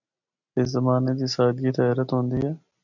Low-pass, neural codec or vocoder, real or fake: 7.2 kHz; none; real